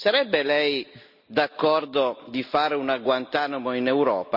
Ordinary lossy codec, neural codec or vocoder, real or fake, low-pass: Opus, 64 kbps; none; real; 5.4 kHz